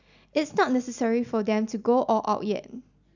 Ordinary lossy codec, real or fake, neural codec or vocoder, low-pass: none; real; none; 7.2 kHz